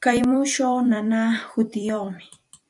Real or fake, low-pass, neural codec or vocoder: fake; 10.8 kHz; vocoder, 44.1 kHz, 128 mel bands every 256 samples, BigVGAN v2